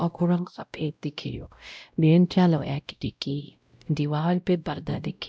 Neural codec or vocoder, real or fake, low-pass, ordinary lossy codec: codec, 16 kHz, 0.5 kbps, X-Codec, WavLM features, trained on Multilingual LibriSpeech; fake; none; none